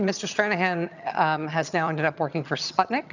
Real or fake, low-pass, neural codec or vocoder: fake; 7.2 kHz; vocoder, 22.05 kHz, 80 mel bands, HiFi-GAN